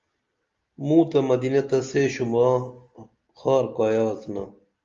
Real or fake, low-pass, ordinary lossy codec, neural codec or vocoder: real; 7.2 kHz; Opus, 32 kbps; none